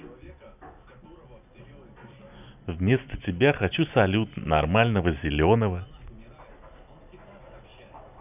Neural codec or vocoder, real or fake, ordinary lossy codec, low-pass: none; real; none; 3.6 kHz